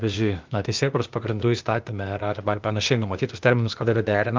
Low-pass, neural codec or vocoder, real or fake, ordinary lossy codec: 7.2 kHz; codec, 16 kHz, 0.8 kbps, ZipCodec; fake; Opus, 32 kbps